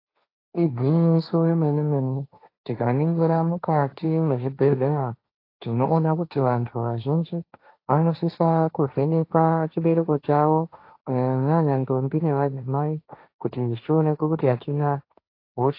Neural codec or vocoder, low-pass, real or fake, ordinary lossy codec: codec, 16 kHz, 1.1 kbps, Voila-Tokenizer; 5.4 kHz; fake; AAC, 32 kbps